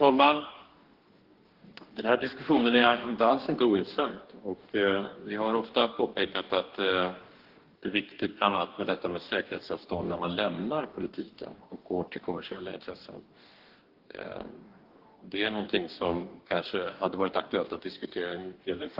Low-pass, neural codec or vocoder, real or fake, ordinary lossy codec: 5.4 kHz; codec, 44.1 kHz, 2.6 kbps, DAC; fake; Opus, 16 kbps